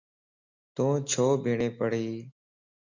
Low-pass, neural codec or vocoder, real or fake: 7.2 kHz; none; real